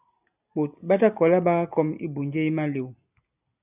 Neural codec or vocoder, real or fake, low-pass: none; real; 3.6 kHz